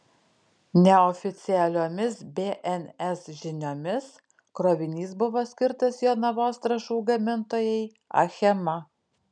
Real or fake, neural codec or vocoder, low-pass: real; none; 9.9 kHz